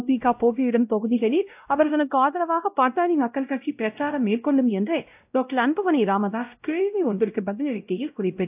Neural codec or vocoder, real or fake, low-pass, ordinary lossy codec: codec, 16 kHz, 0.5 kbps, X-Codec, WavLM features, trained on Multilingual LibriSpeech; fake; 3.6 kHz; AAC, 32 kbps